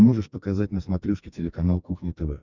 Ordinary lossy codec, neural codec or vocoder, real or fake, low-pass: Opus, 64 kbps; codec, 44.1 kHz, 2.6 kbps, SNAC; fake; 7.2 kHz